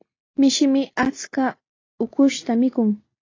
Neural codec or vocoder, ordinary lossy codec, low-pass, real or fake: none; AAC, 32 kbps; 7.2 kHz; real